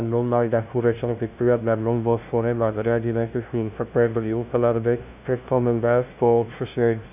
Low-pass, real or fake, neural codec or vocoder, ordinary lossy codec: 3.6 kHz; fake; codec, 16 kHz, 0.5 kbps, FunCodec, trained on LibriTTS, 25 frames a second; none